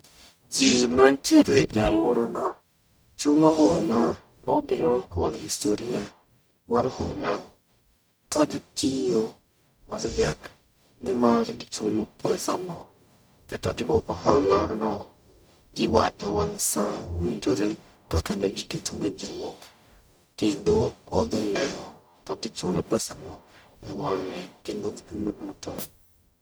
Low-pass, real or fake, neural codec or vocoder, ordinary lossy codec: none; fake; codec, 44.1 kHz, 0.9 kbps, DAC; none